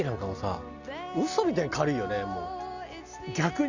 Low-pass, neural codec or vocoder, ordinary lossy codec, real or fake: 7.2 kHz; none; Opus, 64 kbps; real